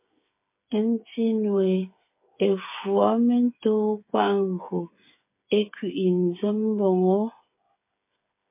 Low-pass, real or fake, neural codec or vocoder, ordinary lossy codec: 3.6 kHz; fake; codec, 16 kHz, 8 kbps, FreqCodec, smaller model; MP3, 24 kbps